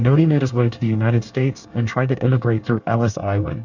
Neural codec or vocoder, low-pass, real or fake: codec, 24 kHz, 1 kbps, SNAC; 7.2 kHz; fake